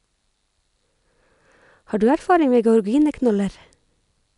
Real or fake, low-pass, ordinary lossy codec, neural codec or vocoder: real; 10.8 kHz; none; none